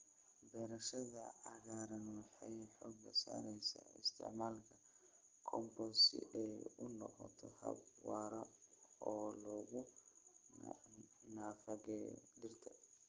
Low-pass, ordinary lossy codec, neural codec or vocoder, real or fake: 7.2 kHz; Opus, 16 kbps; none; real